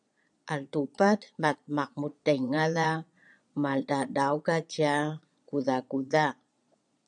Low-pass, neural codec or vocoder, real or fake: 9.9 kHz; vocoder, 22.05 kHz, 80 mel bands, Vocos; fake